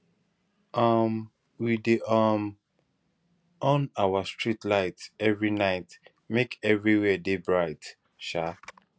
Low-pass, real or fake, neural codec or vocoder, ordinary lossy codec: none; real; none; none